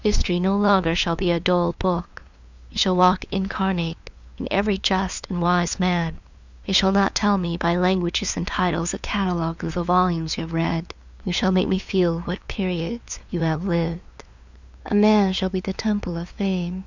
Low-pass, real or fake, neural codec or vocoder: 7.2 kHz; fake; codec, 16 kHz, 2 kbps, FunCodec, trained on LibriTTS, 25 frames a second